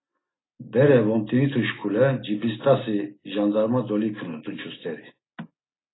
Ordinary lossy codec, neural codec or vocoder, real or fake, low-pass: AAC, 16 kbps; none; real; 7.2 kHz